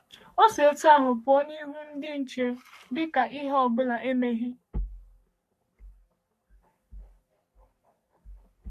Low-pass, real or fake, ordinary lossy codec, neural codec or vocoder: 14.4 kHz; fake; MP3, 64 kbps; codec, 44.1 kHz, 3.4 kbps, Pupu-Codec